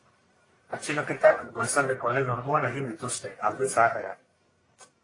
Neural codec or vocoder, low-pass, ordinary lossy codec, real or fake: codec, 44.1 kHz, 1.7 kbps, Pupu-Codec; 10.8 kHz; AAC, 32 kbps; fake